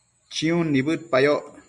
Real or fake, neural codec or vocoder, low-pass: real; none; 10.8 kHz